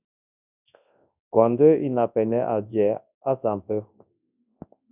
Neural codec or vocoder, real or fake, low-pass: codec, 24 kHz, 0.9 kbps, WavTokenizer, large speech release; fake; 3.6 kHz